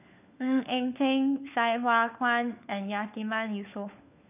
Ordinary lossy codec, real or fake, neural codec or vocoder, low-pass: none; fake; codec, 16 kHz, 4 kbps, FunCodec, trained on LibriTTS, 50 frames a second; 3.6 kHz